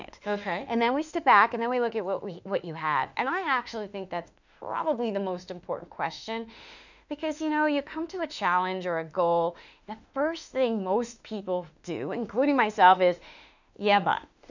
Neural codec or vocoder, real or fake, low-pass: autoencoder, 48 kHz, 32 numbers a frame, DAC-VAE, trained on Japanese speech; fake; 7.2 kHz